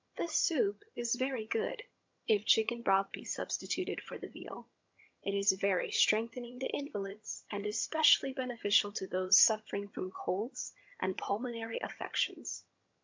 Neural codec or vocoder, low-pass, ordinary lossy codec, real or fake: vocoder, 22.05 kHz, 80 mel bands, HiFi-GAN; 7.2 kHz; MP3, 64 kbps; fake